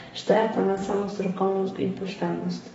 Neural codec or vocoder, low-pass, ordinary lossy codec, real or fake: codec, 32 kHz, 1.9 kbps, SNAC; 14.4 kHz; AAC, 24 kbps; fake